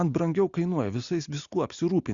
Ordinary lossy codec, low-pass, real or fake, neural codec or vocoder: Opus, 64 kbps; 7.2 kHz; real; none